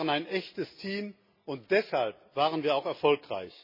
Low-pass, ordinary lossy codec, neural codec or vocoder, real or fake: 5.4 kHz; MP3, 24 kbps; none; real